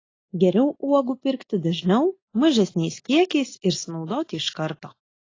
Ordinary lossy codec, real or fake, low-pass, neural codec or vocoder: AAC, 32 kbps; real; 7.2 kHz; none